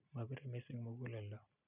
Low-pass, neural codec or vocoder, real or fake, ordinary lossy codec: 3.6 kHz; none; real; none